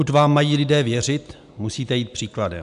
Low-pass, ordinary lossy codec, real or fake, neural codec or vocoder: 10.8 kHz; MP3, 96 kbps; real; none